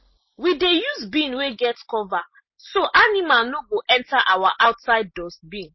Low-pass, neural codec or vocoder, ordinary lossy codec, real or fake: 7.2 kHz; none; MP3, 24 kbps; real